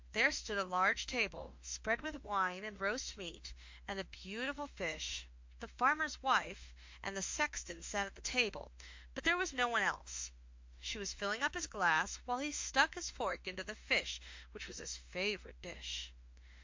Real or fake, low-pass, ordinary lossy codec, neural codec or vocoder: fake; 7.2 kHz; MP3, 48 kbps; autoencoder, 48 kHz, 32 numbers a frame, DAC-VAE, trained on Japanese speech